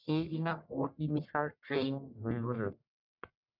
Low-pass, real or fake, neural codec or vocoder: 5.4 kHz; fake; codec, 44.1 kHz, 1.7 kbps, Pupu-Codec